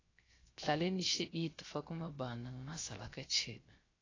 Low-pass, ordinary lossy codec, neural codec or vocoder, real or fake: 7.2 kHz; AAC, 32 kbps; codec, 16 kHz, 0.3 kbps, FocalCodec; fake